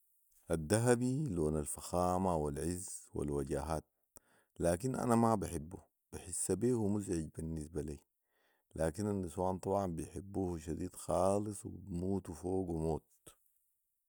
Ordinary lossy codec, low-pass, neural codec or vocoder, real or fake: none; none; none; real